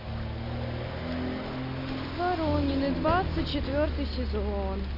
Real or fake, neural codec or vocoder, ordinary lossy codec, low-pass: real; none; Opus, 64 kbps; 5.4 kHz